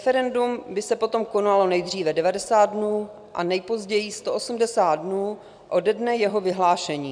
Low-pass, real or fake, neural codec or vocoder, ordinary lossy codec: 9.9 kHz; real; none; MP3, 96 kbps